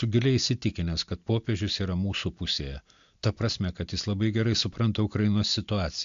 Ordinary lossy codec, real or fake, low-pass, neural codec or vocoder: AAC, 64 kbps; real; 7.2 kHz; none